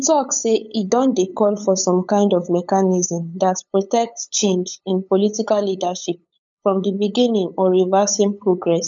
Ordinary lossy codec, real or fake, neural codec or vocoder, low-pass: none; fake; codec, 16 kHz, 8 kbps, FunCodec, trained on LibriTTS, 25 frames a second; 7.2 kHz